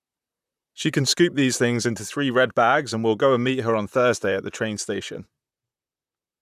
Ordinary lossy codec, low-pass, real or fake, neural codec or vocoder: none; 14.4 kHz; fake; vocoder, 44.1 kHz, 128 mel bands, Pupu-Vocoder